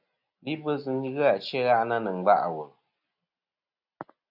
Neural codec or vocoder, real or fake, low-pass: none; real; 5.4 kHz